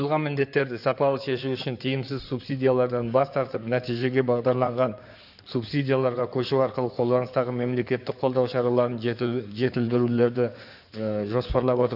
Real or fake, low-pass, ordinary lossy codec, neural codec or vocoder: fake; 5.4 kHz; none; codec, 16 kHz in and 24 kHz out, 2.2 kbps, FireRedTTS-2 codec